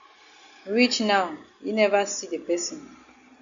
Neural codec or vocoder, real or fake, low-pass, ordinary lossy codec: none; real; 7.2 kHz; MP3, 64 kbps